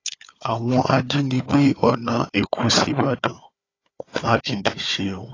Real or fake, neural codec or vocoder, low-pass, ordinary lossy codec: fake; codec, 16 kHz, 4 kbps, FunCodec, trained on Chinese and English, 50 frames a second; 7.2 kHz; AAC, 32 kbps